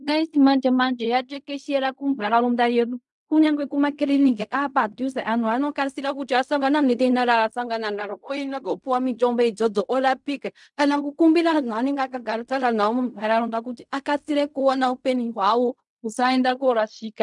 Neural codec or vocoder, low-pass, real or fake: codec, 16 kHz in and 24 kHz out, 0.4 kbps, LongCat-Audio-Codec, fine tuned four codebook decoder; 10.8 kHz; fake